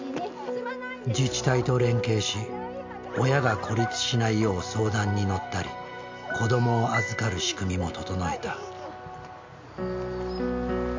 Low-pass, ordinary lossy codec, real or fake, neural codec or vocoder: 7.2 kHz; MP3, 64 kbps; real; none